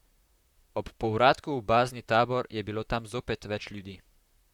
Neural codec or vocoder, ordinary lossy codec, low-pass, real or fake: vocoder, 44.1 kHz, 128 mel bands, Pupu-Vocoder; Opus, 64 kbps; 19.8 kHz; fake